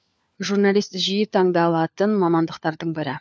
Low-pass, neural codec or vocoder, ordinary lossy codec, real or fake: none; codec, 16 kHz, 4 kbps, FunCodec, trained on LibriTTS, 50 frames a second; none; fake